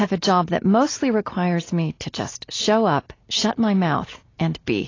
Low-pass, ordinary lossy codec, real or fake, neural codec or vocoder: 7.2 kHz; AAC, 32 kbps; real; none